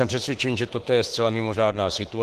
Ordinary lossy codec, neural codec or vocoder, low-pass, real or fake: Opus, 16 kbps; autoencoder, 48 kHz, 32 numbers a frame, DAC-VAE, trained on Japanese speech; 14.4 kHz; fake